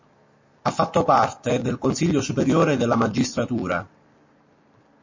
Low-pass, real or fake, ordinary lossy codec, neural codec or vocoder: 7.2 kHz; real; MP3, 32 kbps; none